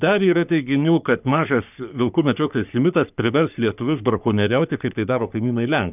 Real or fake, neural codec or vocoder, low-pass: fake; codec, 44.1 kHz, 3.4 kbps, Pupu-Codec; 3.6 kHz